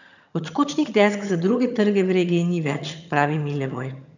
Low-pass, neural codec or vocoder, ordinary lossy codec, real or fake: 7.2 kHz; vocoder, 22.05 kHz, 80 mel bands, HiFi-GAN; none; fake